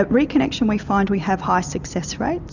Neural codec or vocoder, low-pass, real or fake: none; 7.2 kHz; real